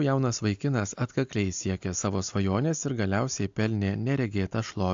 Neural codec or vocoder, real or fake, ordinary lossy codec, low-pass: none; real; AAC, 64 kbps; 7.2 kHz